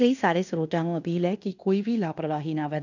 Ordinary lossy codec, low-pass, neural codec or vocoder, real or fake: none; 7.2 kHz; codec, 16 kHz in and 24 kHz out, 0.9 kbps, LongCat-Audio-Codec, fine tuned four codebook decoder; fake